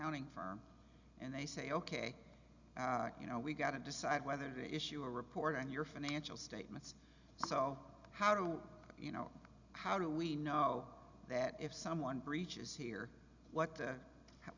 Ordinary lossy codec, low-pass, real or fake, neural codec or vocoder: Opus, 64 kbps; 7.2 kHz; real; none